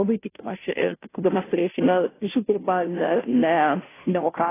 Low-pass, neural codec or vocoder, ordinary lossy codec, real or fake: 3.6 kHz; codec, 16 kHz, 0.5 kbps, FunCodec, trained on Chinese and English, 25 frames a second; AAC, 16 kbps; fake